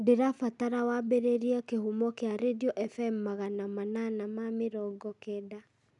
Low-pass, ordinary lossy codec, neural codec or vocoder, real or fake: 10.8 kHz; none; none; real